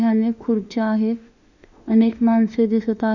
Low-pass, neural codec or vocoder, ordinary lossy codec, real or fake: 7.2 kHz; autoencoder, 48 kHz, 32 numbers a frame, DAC-VAE, trained on Japanese speech; none; fake